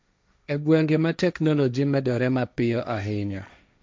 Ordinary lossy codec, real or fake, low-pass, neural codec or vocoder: none; fake; none; codec, 16 kHz, 1.1 kbps, Voila-Tokenizer